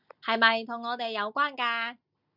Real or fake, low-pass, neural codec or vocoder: real; 5.4 kHz; none